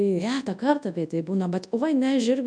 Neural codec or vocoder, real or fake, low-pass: codec, 24 kHz, 0.9 kbps, WavTokenizer, large speech release; fake; 9.9 kHz